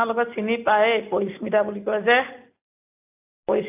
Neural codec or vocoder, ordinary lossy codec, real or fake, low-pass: none; AAC, 32 kbps; real; 3.6 kHz